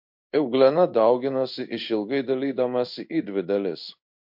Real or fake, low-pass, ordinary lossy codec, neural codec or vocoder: fake; 5.4 kHz; MP3, 48 kbps; codec, 16 kHz in and 24 kHz out, 1 kbps, XY-Tokenizer